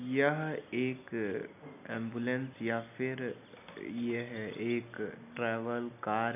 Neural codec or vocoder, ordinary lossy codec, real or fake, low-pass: none; AAC, 32 kbps; real; 3.6 kHz